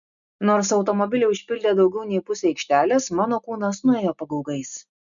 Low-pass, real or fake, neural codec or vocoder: 7.2 kHz; real; none